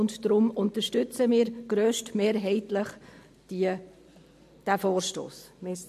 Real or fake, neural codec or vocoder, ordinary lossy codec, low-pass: fake; vocoder, 44.1 kHz, 128 mel bands every 512 samples, BigVGAN v2; MP3, 64 kbps; 14.4 kHz